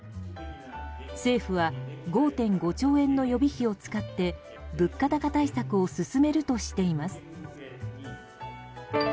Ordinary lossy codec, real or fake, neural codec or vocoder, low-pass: none; real; none; none